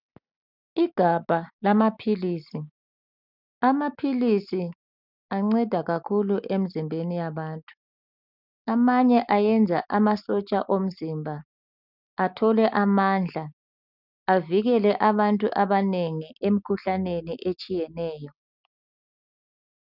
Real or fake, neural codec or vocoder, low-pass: real; none; 5.4 kHz